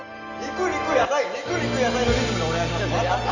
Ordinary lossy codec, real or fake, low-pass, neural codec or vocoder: none; real; 7.2 kHz; none